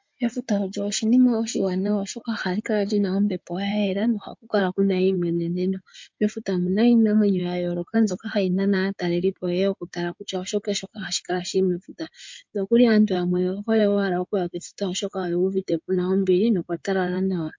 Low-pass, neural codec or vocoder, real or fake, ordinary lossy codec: 7.2 kHz; codec, 16 kHz in and 24 kHz out, 2.2 kbps, FireRedTTS-2 codec; fake; MP3, 48 kbps